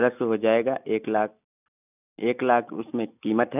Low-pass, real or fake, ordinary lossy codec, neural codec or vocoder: 3.6 kHz; real; none; none